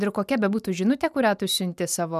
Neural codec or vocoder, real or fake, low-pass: none; real; 14.4 kHz